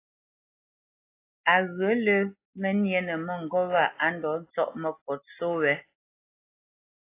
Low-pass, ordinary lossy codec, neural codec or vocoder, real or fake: 3.6 kHz; AAC, 24 kbps; none; real